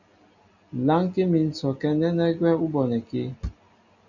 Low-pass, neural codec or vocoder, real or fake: 7.2 kHz; none; real